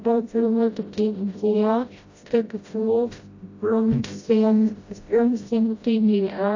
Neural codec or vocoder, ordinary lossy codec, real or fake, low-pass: codec, 16 kHz, 0.5 kbps, FreqCodec, smaller model; AAC, 48 kbps; fake; 7.2 kHz